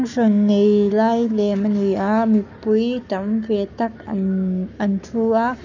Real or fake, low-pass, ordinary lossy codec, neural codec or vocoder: fake; 7.2 kHz; none; codec, 44.1 kHz, 7.8 kbps, Pupu-Codec